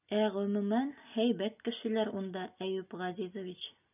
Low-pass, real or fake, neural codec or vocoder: 3.6 kHz; real; none